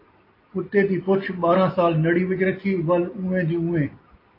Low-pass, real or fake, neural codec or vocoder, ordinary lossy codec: 5.4 kHz; real; none; AAC, 24 kbps